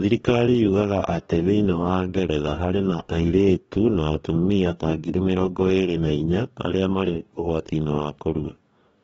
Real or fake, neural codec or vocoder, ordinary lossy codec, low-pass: fake; codec, 44.1 kHz, 2.6 kbps, DAC; AAC, 24 kbps; 19.8 kHz